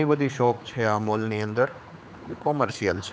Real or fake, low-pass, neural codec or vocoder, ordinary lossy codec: fake; none; codec, 16 kHz, 4 kbps, X-Codec, HuBERT features, trained on general audio; none